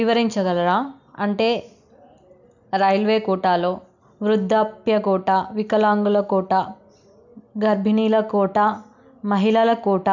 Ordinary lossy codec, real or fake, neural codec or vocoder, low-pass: none; real; none; 7.2 kHz